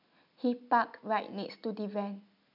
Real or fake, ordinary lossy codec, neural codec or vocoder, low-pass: real; none; none; 5.4 kHz